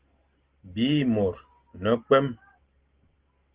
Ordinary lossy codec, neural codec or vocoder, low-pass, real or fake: Opus, 16 kbps; none; 3.6 kHz; real